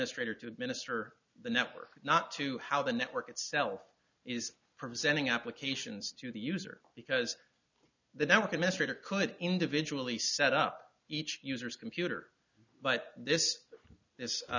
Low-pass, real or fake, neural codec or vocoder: 7.2 kHz; real; none